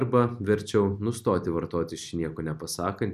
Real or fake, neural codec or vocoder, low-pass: real; none; 14.4 kHz